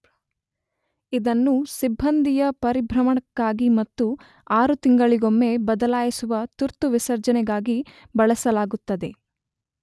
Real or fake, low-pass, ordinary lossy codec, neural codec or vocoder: real; none; none; none